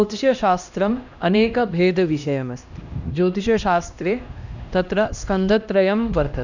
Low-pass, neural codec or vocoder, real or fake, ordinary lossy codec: 7.2 kHz; codec, 16 kHz, 1 kbps, X-Codec, HuBERT features, trained on LibriSpeech; fake; none